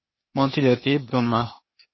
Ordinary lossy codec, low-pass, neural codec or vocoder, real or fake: MP3, 24 kbps; 7.2 kHz; codec, 16 kHz, 0.8 kbps, ZipCodec; fake